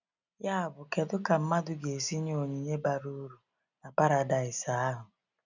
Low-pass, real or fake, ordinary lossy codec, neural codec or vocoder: 7.2 kHz; real; none; none